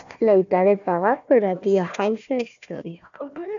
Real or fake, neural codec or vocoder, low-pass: fake; codec, 16 kHz, 1 kbps, FunCodec, trained on Chinese and English, 50 frames a second; 7.2 kHz